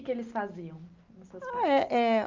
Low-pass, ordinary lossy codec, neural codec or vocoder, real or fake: 7.2 kHz; Opus, 24 kbps; none; real